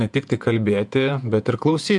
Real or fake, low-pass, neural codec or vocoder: fake; 10.8 kHz; vocoder, 48 kHz, 128 mel bands, Vocos